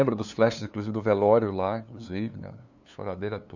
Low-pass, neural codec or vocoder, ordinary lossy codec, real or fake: 7.2 kHz; codec, 16 kHz, 2 kbps, FunCodec, trained on LibriTTS, 25 frames a second; none; fake